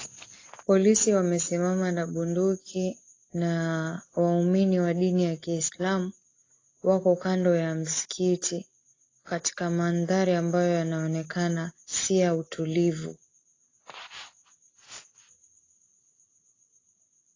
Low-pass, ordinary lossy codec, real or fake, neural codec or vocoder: 7.2 kHz; AAC, 32 kbps; real; none